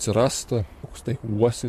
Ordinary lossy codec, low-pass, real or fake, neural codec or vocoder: MP3, 64 kbps; 14.4 kHz; fake; vocoder, 44.1 kHz, 128 mel bands, Pupu-Vocoder